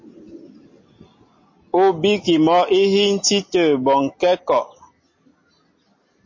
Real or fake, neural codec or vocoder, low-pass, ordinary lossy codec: real; none; 7.2 kHz; MP3, 32 kbps